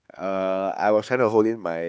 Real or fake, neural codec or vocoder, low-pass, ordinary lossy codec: fake; codec, 16 kHz, 4 kbps, X-Codec, HuBERT features, trained on LibriSpeech; none; none